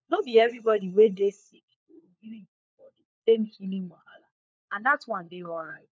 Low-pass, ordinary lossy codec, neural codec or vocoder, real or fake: none; none; codec, 16 kHz, 4 kbps, FunCodec, trained on LibriTTS, 50 frames a second; fake